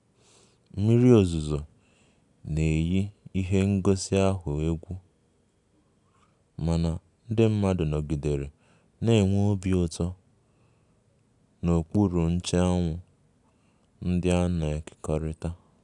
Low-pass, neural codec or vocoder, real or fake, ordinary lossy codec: 10.8 kHz; none; real; none